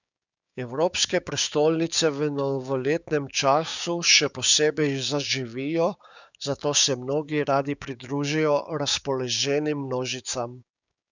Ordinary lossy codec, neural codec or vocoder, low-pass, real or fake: none; codec, 16 kHz, 6 kbps, DAC; 7.2 kHz; fake